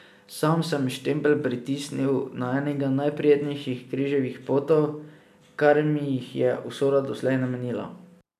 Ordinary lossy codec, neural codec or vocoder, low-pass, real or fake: none; none; 14.4 kHz; real